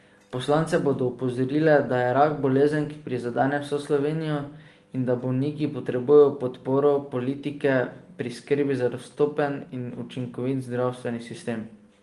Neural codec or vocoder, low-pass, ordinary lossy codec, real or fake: none; 10.8 kHz; Opus, 24 kbps; real